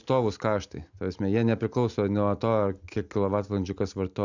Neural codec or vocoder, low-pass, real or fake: none; 7.2 kHz; real